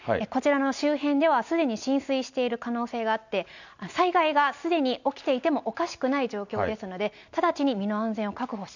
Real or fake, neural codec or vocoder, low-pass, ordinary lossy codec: real; none; 7.2 kHz; none